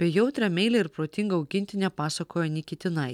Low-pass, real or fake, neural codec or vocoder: 19.8 kHz; real; none